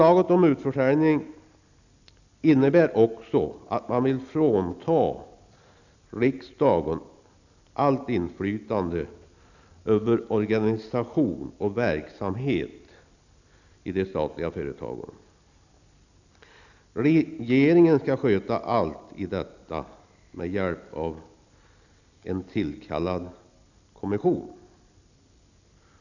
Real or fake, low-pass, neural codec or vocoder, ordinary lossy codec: real; 7.2 kHz; none; none